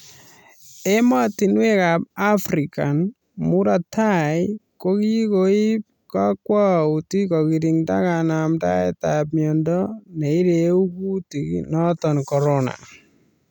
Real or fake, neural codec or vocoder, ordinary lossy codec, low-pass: real; none; none; 19.8 kHz